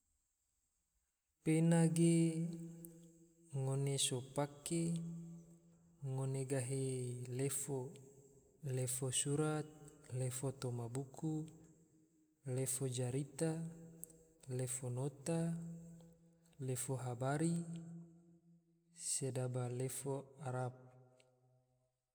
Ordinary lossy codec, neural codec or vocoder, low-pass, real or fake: none; none; none; real